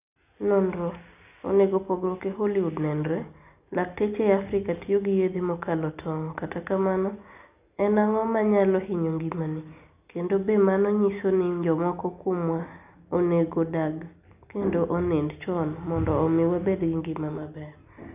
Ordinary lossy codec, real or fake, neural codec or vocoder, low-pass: none; real; none; 3.6 kHz